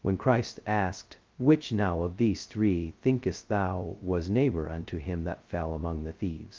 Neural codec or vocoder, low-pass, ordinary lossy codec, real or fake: codec, 16 kHz, 0.2 kbps, FocalCodec; 7.2 kHz; Opus, 24 kbps; fake